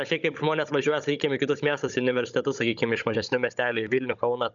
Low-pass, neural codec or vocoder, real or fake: 7.2 kHz; codec, 16 kHz, 16 kbps, FunCodec, trained on Chinese and English, 50 frames a second; fake